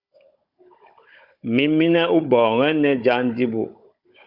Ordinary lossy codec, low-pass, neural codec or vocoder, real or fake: Opus, 64 kbps; 5.4 kHz; codec, 16 kHz, 16 kbps, FunCodec, trained on Chinese and English, 50 frames a second; fake